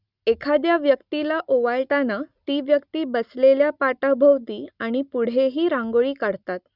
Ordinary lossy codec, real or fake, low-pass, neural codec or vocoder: Opus, 64 kbps; real; 5.4 kHz; none